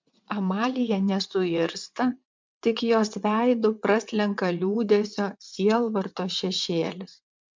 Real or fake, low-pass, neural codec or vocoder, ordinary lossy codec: fake; 7.2 kHz; vocoder, 22.05 kHz, 80 mel bands, WaveNeXt; MP3, 64 kbps